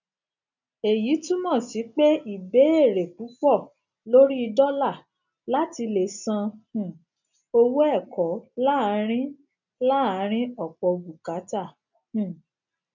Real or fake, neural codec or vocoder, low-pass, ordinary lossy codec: real; none; 7.2 kHz; none